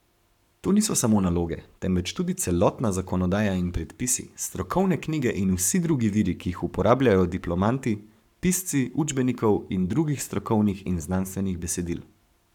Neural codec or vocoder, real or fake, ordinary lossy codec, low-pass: codec, 44.1 kHz, 7.8 kbps, Pupu-Codec; fake; none; 19.8 kHz